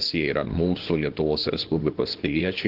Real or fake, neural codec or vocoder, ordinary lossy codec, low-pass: fake; codec, 24 kHz, 0.9 kbps, WavTokenizer, small release; Opus, 16 kbps; 5.4 kHz